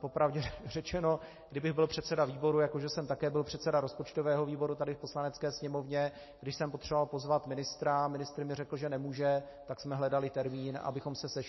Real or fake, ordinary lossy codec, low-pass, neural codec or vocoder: real; MP3, 24 kbps; 7.2 kHz; none